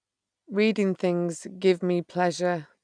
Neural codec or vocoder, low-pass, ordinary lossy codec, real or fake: none; 9.9 kHz; none; real